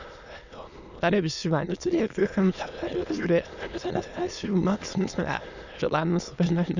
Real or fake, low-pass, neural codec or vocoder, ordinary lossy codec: fake; 7.2 kHz; autoencoder, 22.05 kHz, a latent of 192 numbers a frame, VITS, trained on many speakers; none